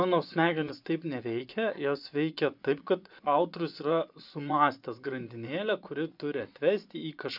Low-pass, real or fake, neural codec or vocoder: 5.4 kHz; fake; vocoder, 24 kHz, 100 mel bands, Vocos